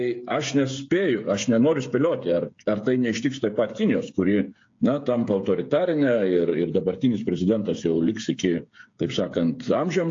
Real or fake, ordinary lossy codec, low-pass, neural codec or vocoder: fake; AAC, 48 kbps; 7.2 kHz; codec, 16 kHz, 8 kbps, FreqCodec, smaller model